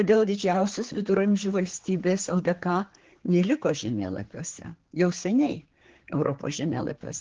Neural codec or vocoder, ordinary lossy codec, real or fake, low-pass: codec, 16 kHz, 4 kbps, X-Codec, HuBERT features, trained on general audio; Opus, 16 kbps; fake; 7.2 kHz